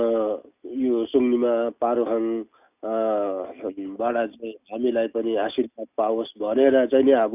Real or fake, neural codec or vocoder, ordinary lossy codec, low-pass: real; none; none; 3.6 kHz